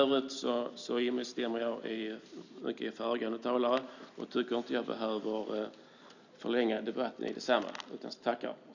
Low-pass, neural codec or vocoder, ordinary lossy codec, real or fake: 7.2 kHz; none; none; real